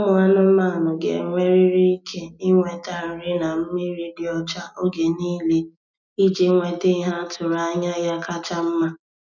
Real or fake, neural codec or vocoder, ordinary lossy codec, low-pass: real; none; none; 7.2 kHz